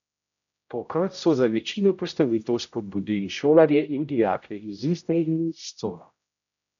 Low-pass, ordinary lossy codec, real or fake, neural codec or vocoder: 7.2 kHz; none; fake; codec, 16 kHz, 0.5 kbps, X-Codec, HuBERT features, trained on general audio